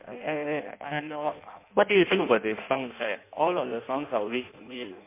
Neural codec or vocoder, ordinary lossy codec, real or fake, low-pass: codec, 16 kHz in and 24 kHz out, 0.6 kbps, FireRedTTS-2 codec; MP3, 24 kbps; fake; 3.6 kHz